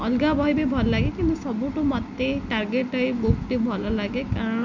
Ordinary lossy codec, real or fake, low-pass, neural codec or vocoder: none; real; 7.2 kHz; none